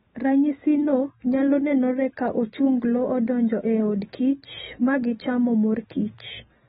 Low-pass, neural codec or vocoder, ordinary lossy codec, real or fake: 19.8 kHz; none; AAC, 16 kbps; real